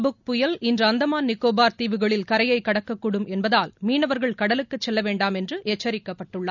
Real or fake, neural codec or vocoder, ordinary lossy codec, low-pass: real; none; none; 7.2 kHz